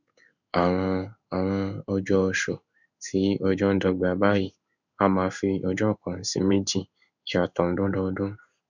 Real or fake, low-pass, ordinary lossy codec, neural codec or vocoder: fake; 7.2 kHz; none; codec, 16 kHz in and 24 kHz out, 1 kbps, XY-Tokenizer